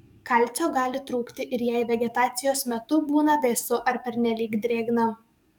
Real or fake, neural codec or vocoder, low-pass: fake; codec, 44.1 kHz, 7.8 kbps, DAC; 19.8 kHz